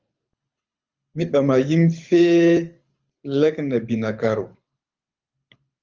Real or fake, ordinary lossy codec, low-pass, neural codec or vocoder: fake; Opus, 24 kbps; 7.2 kHz; codec, 24 kHz, 6 kbps, HILCodec